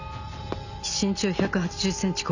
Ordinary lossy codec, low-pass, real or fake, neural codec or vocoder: none; 7.2 kHz; real; none